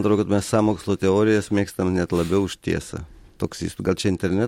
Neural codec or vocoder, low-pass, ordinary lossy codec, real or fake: none; 19.8 kHz; MP3, 64 kbps; real